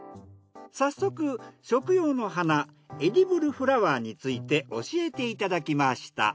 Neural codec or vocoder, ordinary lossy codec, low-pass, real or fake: none; none; none; real